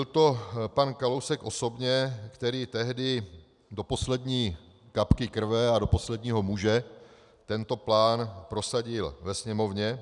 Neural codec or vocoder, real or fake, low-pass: none; real; 10.8 kHz